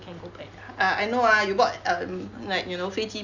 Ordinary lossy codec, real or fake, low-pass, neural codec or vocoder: Opus, 64 kbps; real; 7.2 kHz; none